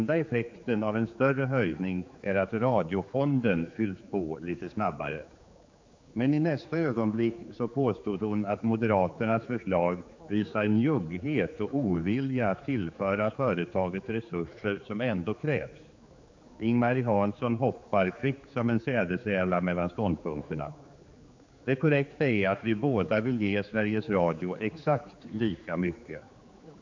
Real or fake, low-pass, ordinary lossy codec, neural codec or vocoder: fake; 7.2 kHz; MP3, 48 kbps; codec, 16 kHz, 4 kbps, X-Codec, HuBERT features, trained on general audio